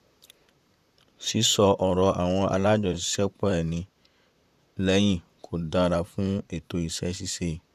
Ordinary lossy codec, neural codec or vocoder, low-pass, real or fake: none; vocoder, 44.1 kHz, 128 mel bands, Pupu-Vocoder; 14.4 kHz; fake